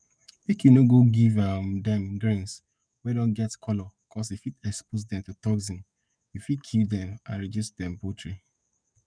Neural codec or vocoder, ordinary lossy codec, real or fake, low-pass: codec, 44.1 kHz, 7.8 kbps, DAC; none; fake; 9.9 kHz